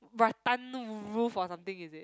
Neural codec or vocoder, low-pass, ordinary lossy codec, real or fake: none; none; none; real